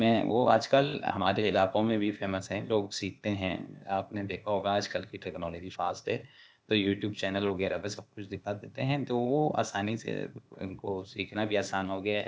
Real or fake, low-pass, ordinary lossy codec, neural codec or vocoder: fake; none; none; codec, 16 kHz, 0.8 kbps, ZipCodec